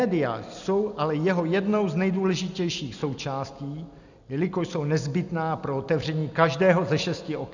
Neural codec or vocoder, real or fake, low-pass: none; real; 7.2 kHz